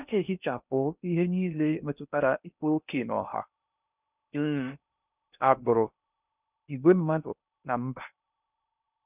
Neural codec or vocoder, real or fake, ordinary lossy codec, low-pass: codec, 16 kHz in and 24 kHz out, 0.6 kbps, FocalCodec, streaming, 4096 codes; fake; none; 3.6 kHz